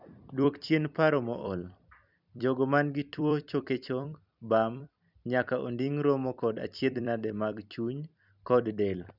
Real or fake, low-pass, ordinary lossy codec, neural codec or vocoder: fake; 5.4 kHz; none; vocoder, 44.1 kHz, 128 mel bands every 256 samples, BigVGAN v2